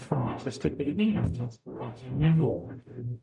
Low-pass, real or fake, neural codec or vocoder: 10.8 kHz; fake; codec, 44.1 kHz, 0.9 kbps, DAC